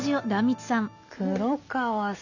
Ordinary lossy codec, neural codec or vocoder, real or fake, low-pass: none; none; real; 7.2 kHz